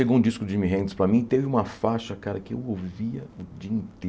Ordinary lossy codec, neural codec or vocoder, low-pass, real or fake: none; none; none; real